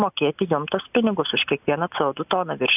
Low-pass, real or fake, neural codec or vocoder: 3.6 kHz; real; none